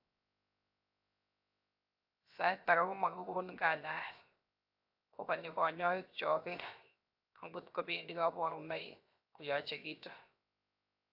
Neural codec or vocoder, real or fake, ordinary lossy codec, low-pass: codec, 16 kHz, 0.7 kbps, FocalCodec; fake; AAC, 48 kbps; 5.4 kHz